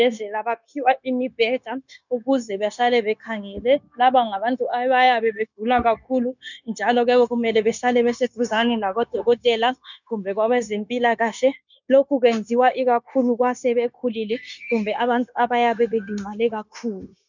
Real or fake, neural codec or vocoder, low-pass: fake; codec, 16 kHz, 0.9 kbps, LongCat-Audio-Codec; 7.2 kHz